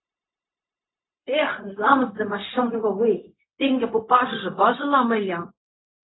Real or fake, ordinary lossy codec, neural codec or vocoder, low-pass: fake; AAC, 16 kbps; codec, 16 kHz, 0.4 kbps, LongCat-Audio-Codec; 7.2 kHz